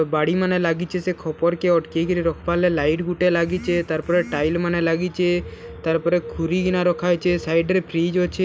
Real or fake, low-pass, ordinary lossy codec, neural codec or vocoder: real; none; none; none